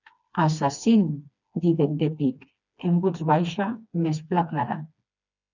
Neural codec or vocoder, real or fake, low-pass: codec, 16 kHz, 2 kbps, FreqCodec, smaller model; fake; 7.2 kHz